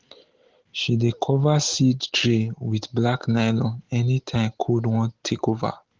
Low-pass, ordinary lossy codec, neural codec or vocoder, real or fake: 7.2 kHz; Opus, 16 kbps; none; real